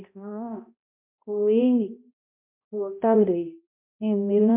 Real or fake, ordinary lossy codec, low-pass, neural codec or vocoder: fake; MP3, 32 kbps; 3.6 kHz; codec, 16 kHz, 0.5 kbps, X-Codec, HuBERT features, trained on balanced general audio